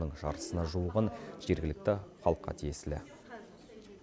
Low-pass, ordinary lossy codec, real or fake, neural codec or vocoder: none; none; real; none